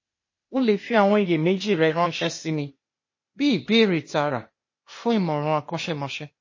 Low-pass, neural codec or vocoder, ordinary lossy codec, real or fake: 7.2 kHz; codec, 16 kHz, 0.8 kbps, ZipCodec; MP3, 32 kbps; fake